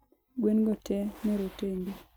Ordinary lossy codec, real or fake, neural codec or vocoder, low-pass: none; real; none; none